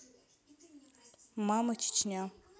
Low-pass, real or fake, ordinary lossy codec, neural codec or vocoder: none; real; none; none